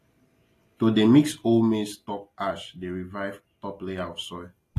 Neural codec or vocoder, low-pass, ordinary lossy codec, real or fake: none; 14.4 kHz; AAC, 48 kbps; real